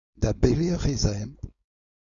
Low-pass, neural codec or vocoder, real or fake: 7.2 kHz; codec, 16 kHz, 4.8 kbps, FACodec; fake